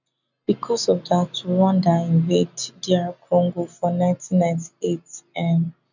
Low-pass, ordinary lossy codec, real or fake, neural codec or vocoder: 7.2 kHz; none; real; none